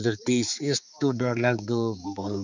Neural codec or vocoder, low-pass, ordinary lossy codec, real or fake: codec, 16 kHz, 4 kbps, X-Codec, HuBERT features, trained on balanced general audio; 7.2 kHz; none; fake